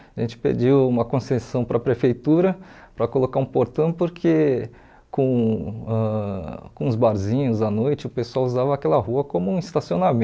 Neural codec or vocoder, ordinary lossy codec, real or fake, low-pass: none; none; real; none